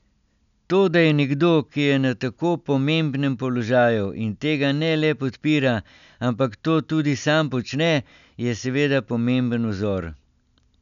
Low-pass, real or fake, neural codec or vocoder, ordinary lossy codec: 7.2 kHz; real; none; none